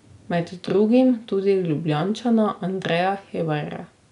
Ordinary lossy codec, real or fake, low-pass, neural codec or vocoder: none; real; 10.8 kHz; none